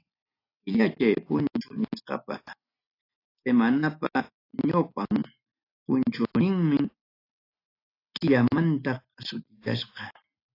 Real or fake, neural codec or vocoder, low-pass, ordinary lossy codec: real; none; 5.4 kHz; AAC, 32 kbps